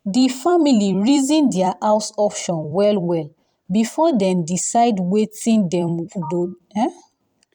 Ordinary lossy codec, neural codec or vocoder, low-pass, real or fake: none; vocoder, 44.1 kHz, 128 mel bands every 256 samples, BigVGAN v2; 19.8 kHz; fake